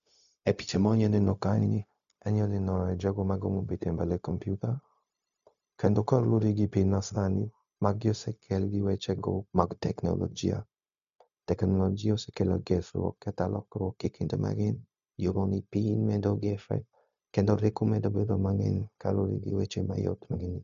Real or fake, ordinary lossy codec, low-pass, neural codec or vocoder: fake; MP3, 64 kbps; 7.2 kHz; codec, 16 kHz, 0.4 kbps, LongCat-Audio-Codec